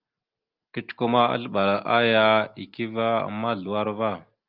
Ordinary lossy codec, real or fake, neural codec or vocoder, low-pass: Opus, 32 kbps; real; none; 5.4 kHz